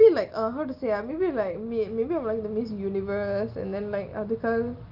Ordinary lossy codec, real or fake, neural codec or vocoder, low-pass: Opus, 32 kbps; real; none; 5.4 kHz